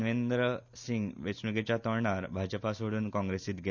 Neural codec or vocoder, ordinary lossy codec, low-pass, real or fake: none; none; 7.2 kHz; real